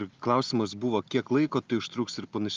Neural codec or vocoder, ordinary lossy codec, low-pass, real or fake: none; Opus, 24 kbps; 7.2 kHz; real